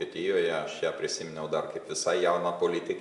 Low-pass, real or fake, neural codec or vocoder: 10.8 kHz; real; none